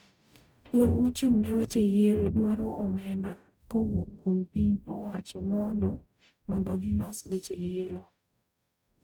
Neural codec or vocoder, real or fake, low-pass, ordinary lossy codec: codec, 44.1 kHz, 0.9 kbps, DAC; fake; 19.8 kHz; none